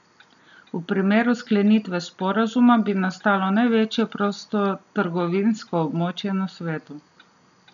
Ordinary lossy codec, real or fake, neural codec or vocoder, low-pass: none; real; none; 7.2 kHz